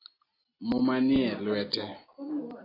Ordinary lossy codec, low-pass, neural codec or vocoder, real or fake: AAC, 24 kbps; 5.4 kHz; none; real